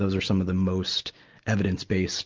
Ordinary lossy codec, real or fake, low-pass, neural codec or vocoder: Opus, 24 kbps; real; 7.2 kHz; none